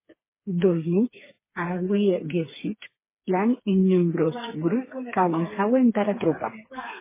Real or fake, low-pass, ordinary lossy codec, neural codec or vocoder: fake; 3.6 kHz; MP3, 16 kbps; codec, 16 kHz, 4 kbps, FreqCodec, smaller model